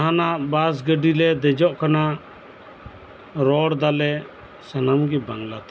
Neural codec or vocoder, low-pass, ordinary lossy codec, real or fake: none; none; none; real